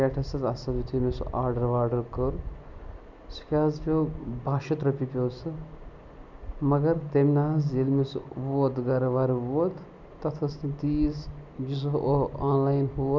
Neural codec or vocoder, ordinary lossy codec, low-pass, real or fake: none; none; 7.2 kHz; real